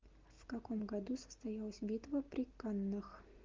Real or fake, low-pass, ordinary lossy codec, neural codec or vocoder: real; 7.2 kHz; Opus, 24 kbps; none